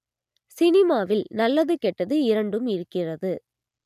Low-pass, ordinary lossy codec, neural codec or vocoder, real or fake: 14.4 kHz; none; none; real